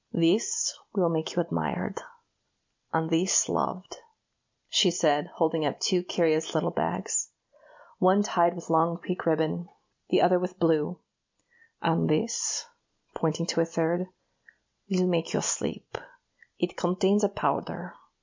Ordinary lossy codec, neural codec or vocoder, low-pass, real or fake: MP3, 64 kbps; none; 7.2 kHz; real